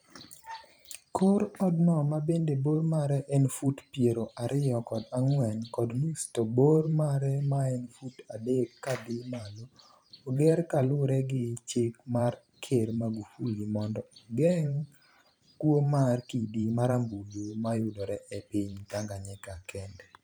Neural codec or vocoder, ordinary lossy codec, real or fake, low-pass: vocoder, 44.1 kHz, 128 mel bands every 512 samples, BigVGAN v2; none; fake; none